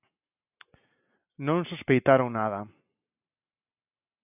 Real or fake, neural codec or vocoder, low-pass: real; none; 3.6 kHz